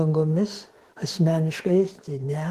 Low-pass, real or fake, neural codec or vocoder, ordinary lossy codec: 14.4 kHz; fake; autoencoder, 48 kHz, 32 numbers a frame, DAC-VAE, trained on Japanese speech; Opus, 16 kbps